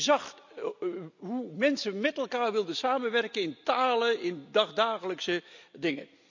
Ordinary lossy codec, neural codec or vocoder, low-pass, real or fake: none; none; 7.2 kHz; real